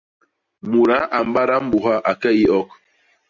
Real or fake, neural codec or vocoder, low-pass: real; none; 7.2 kHz